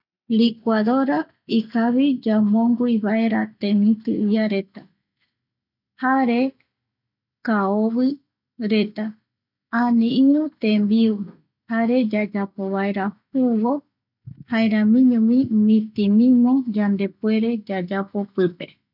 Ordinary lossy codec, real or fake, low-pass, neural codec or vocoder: none; real; 5.4 kHz; none